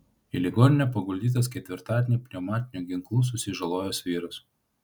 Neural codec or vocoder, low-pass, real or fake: none; 19.8 kHz; real